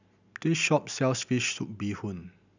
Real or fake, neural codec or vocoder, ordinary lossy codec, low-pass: real; none; none; 7.2 kHz